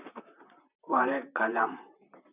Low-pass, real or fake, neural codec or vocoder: 3.6 kHz; fake; vocoder, 44.1 kHz, 128 mel bands, Pupu-Vocoder